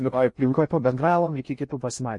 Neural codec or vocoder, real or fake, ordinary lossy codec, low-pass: codec, 16 kHz in and 24 kHz out, 0.6 kbps, FocalCodec, streaming, 2048 codes; fake; MP3, 48 kbps; 10.8 kHz